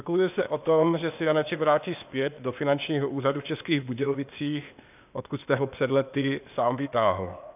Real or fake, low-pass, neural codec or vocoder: fake; 3.6 kHz; codec, 16 kHz, 0.8 kbps, ZipCodec